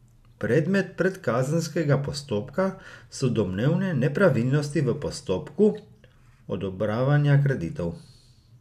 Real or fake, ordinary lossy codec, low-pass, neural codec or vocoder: real; none; 14.4 kHz; none